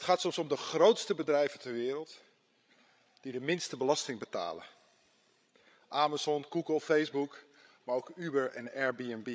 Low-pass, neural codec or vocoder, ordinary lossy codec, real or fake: none; codec, 16 kHz, 16 kbps, FreqCodec, larger model; none; fake